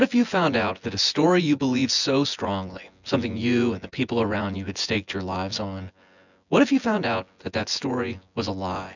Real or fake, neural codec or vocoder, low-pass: fake; vocoder, 24 kHz, 100 mel bands, Vocos; 7.2 kHz